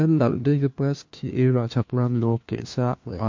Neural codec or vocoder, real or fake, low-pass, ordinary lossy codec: codec, 16 kHz, 1 kbps, FunCodec, trained on LibriTTS, 50 frames a second; fake; 7.2 kHz; MP3, 48 kbps